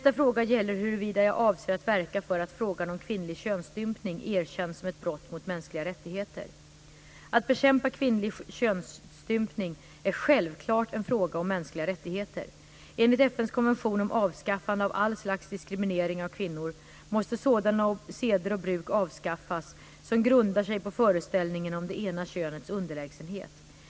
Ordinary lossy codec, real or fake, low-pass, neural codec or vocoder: none; real; none; none